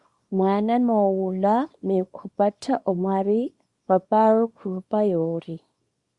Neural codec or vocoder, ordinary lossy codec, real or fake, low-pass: codec, 24 kHz, 0.9 kbps, WavTokenizer, small release; Opus, 64 kbps; fake; 10.8 kHz